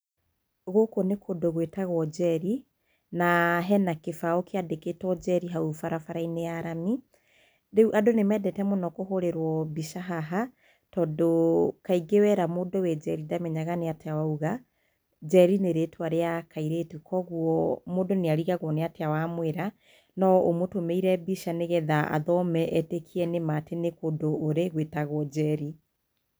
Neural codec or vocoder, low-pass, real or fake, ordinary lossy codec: none; none; real; none